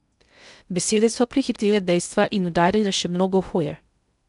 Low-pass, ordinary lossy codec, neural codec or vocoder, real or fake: 10.8 kHz; none; codec, 16 kHz in and 24 kHz out, 0.6 kbps, FocalCodec, streaming, 2048 codes; fake